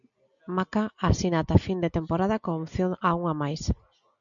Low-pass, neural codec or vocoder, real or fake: 7.2 kHz; none; real